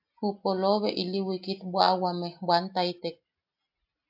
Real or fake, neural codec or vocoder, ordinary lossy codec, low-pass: real; none; AAC, 48 kbps; 5.4 kHz